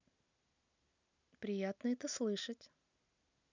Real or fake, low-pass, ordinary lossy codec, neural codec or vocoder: real; 7.2 kHz; none; none